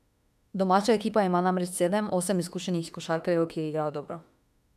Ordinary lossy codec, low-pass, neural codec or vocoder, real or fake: none; 14.4 kHz; autoencoder, 48 kHz, 32 numbers a frame, DAC-VAE, trained on Japanese speech; fake